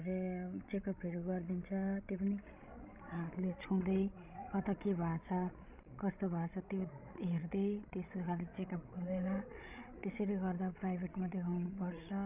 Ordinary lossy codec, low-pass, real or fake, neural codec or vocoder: none; 3.6 kHz; fake; codec, 16 kHz, 16 kbps, FreqCodec, larger model